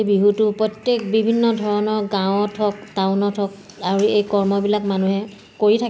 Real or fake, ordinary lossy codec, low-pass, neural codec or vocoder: real; none; none; none